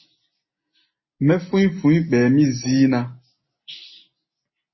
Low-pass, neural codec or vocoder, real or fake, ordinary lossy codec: 7.2 kHz; none; real; MP3, 24 kbps